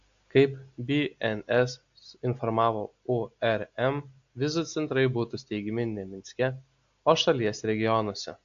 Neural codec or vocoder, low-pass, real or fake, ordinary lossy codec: none; 7.2 kHz; real; AAC, 64 kbps